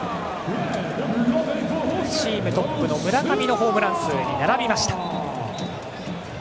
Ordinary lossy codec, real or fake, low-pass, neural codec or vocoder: none; real; none; none